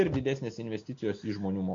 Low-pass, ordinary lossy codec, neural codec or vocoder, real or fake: 7.2 kHz; MP3, 48 kbps; none; real